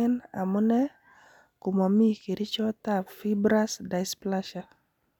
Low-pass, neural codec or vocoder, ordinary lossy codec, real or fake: 19.8 kHz; none; none; real